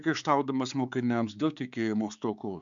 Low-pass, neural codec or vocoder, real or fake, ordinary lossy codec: 7.2 kHz; codec, 16 kHz, 4 kbps, X-Codec, HuBERT features, trained on balanced general audio; fake; MP3, 96 kbps